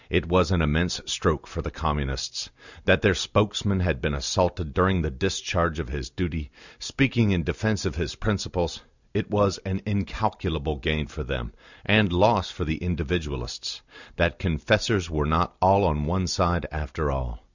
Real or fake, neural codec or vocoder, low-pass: real; none; 7.2 kHz